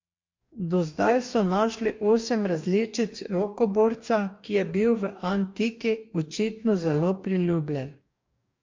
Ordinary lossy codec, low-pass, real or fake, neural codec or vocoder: MP3, 48 kbps; 7.2 kHz; fake; codec, 44.1 kHz, 2.6 kbps, DAC